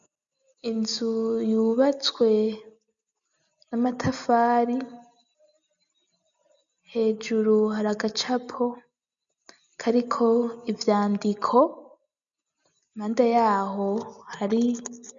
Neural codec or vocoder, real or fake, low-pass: none; real; 7.2 kHz